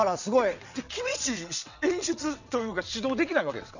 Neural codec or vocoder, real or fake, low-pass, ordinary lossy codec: vocoder, 22.05 kHz, 80 mel bands, WaveNeXt; fake; 7.2 kHz; none